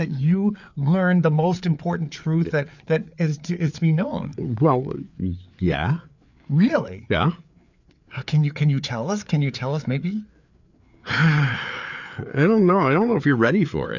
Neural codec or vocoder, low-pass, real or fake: codec, 16 kHz, 4 kbps, FreqCodec, larger model; 7.2 kHz; fake